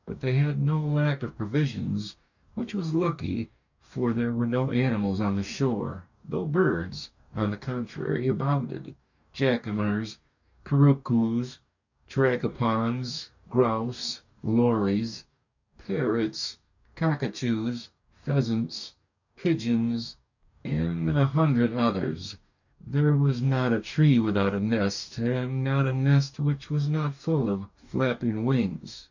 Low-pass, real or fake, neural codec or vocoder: 7.2 kHz; fake; codec, 44.1 kHz, 2.6 kbps, DAC